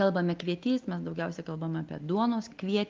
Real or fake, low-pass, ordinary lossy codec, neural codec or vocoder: real; 7.2 kHz; Opus, 32 kbps; none